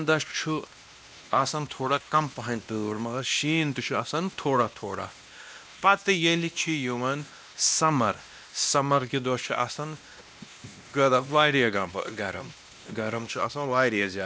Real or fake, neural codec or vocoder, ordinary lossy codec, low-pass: fake; codec, 16 kHz, 1 kbps, X-Codec, WavLM features, trained on Multilingual LibriSpeech; none; none